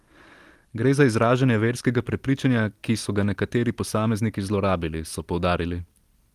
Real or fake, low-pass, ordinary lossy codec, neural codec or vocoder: real; 14.4 kHz; Opus, 24 kbps; none